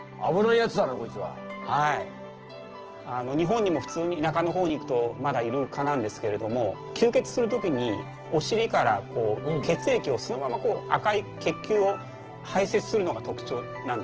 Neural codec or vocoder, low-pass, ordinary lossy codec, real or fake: none; 7.2 kHz; Opus, 16 kbps; real